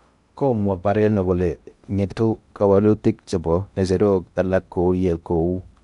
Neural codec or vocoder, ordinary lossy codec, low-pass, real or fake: codec, 16 kHz in and 24 kHz out, 0.6 kbps, FocalCodec, streaming, 2048 codes; MP3, 96 kbps; 10.8 kHz; fake